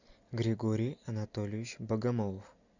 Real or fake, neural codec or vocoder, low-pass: real; none; 7.2 kHz